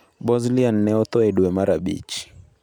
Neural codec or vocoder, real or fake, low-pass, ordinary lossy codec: none; real; 19.8 kHz; none